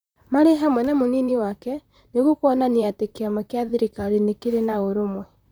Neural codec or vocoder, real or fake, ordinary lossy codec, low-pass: vocoder, 44.1 kHz, 128 mel bands, Pupu-Vocoder; fake; none; none